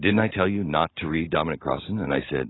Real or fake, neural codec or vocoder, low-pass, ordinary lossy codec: real; none; 7.2 kHz; AAC, 16 kbps